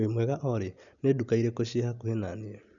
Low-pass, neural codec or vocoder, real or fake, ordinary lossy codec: 7.2 kHz; none; real; none